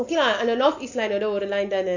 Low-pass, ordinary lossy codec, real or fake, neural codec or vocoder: 7.2 kHz; none; real; none